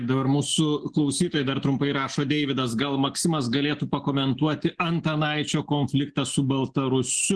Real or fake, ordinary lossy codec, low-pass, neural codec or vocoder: real; Opus, 16 kbps; 10.8 kHz; none